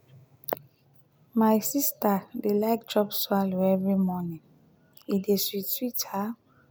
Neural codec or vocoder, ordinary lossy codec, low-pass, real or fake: none; none; none; real